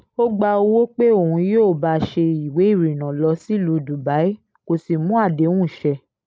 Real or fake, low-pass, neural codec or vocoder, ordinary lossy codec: real; none; none; none